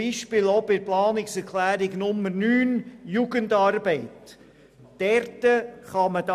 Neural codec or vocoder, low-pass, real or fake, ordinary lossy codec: none; 14.4 kHz; real; none